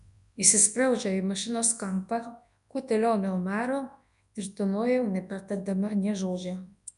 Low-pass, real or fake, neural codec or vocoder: 10.8 kHz; fake; codec, 24 kHz, 0.9 kbps, WavTokenizer, large speech release